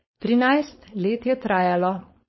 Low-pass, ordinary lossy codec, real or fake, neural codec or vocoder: 7.2 kHz; MP3, 24 kbps; fake; codec, 16 kHz, 4.8 kbps, FACodec